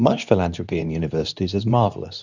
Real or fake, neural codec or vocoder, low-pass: fake; codec, 24 kHz, 0.9 kbps, WavTokenizer, medium speech release version 2; 7.2 kHz